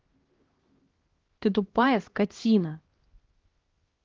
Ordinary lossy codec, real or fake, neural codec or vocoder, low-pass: Opus, 16 kbps; fake; codec, 16 kHz, 1 kbps, X-Codec, HuBERT features, trained on LibriSpeech; 7.2 kHz